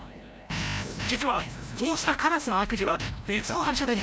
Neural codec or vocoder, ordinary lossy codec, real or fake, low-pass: codec, 16 kHz, 0.5 kbps, FreqCodec, larger model; none; fake; none